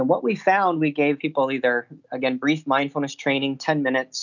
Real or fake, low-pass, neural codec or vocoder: real; 7.2 kHz; none